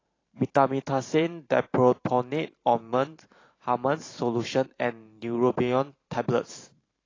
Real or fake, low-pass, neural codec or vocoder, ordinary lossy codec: fake; 7.2 kHz; vocoder, 44.1 kHz, 128 mel bands every 512 samples, BigVGAN v2; AAC, 32 kbps